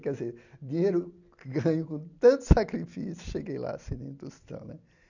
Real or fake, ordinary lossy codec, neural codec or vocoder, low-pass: real; none; none; 7.2 kHz